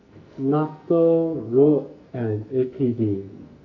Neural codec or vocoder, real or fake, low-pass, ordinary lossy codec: codec, 44.1 kHz, 2.6 kbps, SNAC; fake; 7.2 kHz; none